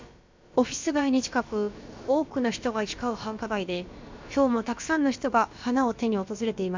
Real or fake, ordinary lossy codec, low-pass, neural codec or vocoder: fake; MP3, 64 kbps; 7.2 kHz; codec, 16 kHz, about 1 kbps, DyCAST, with the encoder's durations